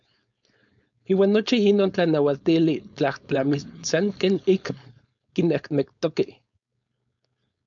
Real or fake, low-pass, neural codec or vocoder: fake; 7.2 kHz; codec, 16 kHz, 4.8 kbps, FACodec